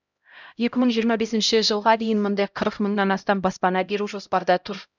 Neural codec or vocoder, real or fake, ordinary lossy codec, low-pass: codec, 16 kHz, 0.5 kbps, X-Codec, HuBERT features, trained on LibriSpeech; fake; none; 7.2 kHz